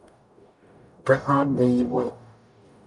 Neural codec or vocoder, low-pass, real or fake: codec, 44.1 kHz, 0.9 kbps, DAC; 10.8 kHz; fake